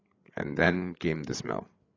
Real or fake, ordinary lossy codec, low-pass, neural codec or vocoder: fake; AAC, 32 kbps; 7.2 kHz; codec, 16 kHz, 8 kbps, FreqCodec, larger model